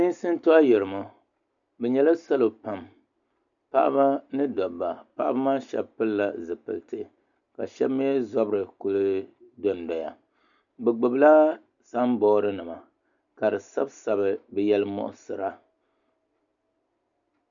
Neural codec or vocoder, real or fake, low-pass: none; real; 7.2 kHz